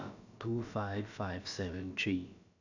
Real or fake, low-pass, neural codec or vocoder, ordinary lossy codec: fake; 7.2 kHz; codec, 16 kHz, about 1 kbps, DyCAST, with the encoder's durations; none